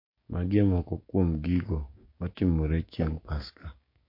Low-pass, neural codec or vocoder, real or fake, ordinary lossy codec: 5.4 kHz; codec, 16 kHz, 6 kbps, DAC; fake; MP3, 32 kbps